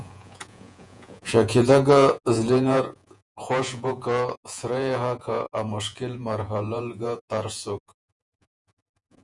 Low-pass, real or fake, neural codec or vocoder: 10.8 kHz; fake; vocoder, 48 kHz, 128 mel bands, Vocos